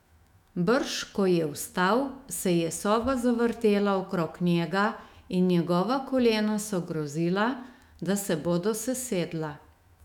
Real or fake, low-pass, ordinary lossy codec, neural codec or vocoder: fake; 19.8 kHz; none; autoencoder, 48 kHz, 128 numbers a frame, DAC-VAE, trained on Japanese speech